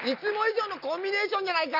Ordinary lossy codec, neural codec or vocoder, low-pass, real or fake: none; none; 5.4 kHz; real